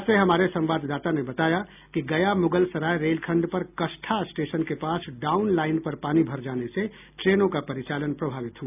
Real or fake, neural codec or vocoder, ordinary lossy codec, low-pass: real; none; none; 3.6 kHz